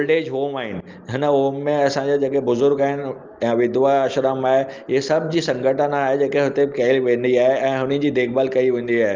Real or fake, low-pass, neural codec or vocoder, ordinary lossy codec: real; 7.2 kHz; none; Opus, 32 kbps